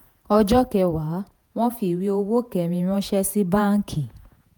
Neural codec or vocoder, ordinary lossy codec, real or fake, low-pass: vocoder, 48 kHz, 128 mel bands, Vocos; none; fake; none